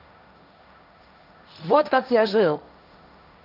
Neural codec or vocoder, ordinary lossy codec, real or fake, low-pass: codec, 16 kHz, 1.1 kbps, Voila-Tokenizer; none; fake; 5.4 kHz